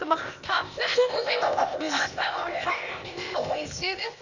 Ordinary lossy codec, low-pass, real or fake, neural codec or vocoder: none; 7.2 kHz; fake; codec, 16 kHz, 0.8 kbps, ZipCodec